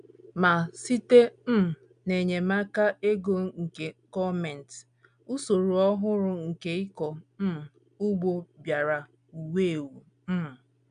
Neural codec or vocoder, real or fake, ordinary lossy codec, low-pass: none; real; none; 9.9 kHz